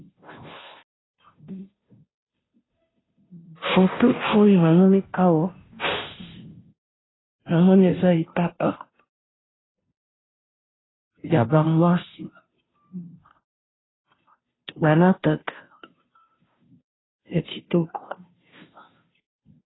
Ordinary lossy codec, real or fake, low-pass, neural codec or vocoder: AAC, 16 kbps; fake; 7.2 kHz; codec, 16 kHz, 0.5 kbps, FunCodec, trained on Chinese and English, 25 frames a second